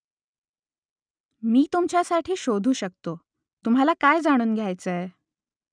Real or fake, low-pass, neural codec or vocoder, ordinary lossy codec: real; 9.9 kHz; none; none